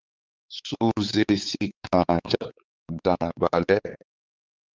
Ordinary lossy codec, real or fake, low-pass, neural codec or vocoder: Opus, 24 kbps; fake; 7.2 kHz; codec, 16 kHz, 4 kbps, X-Codec, WavLM features, trained on Multilingual LibriSpeech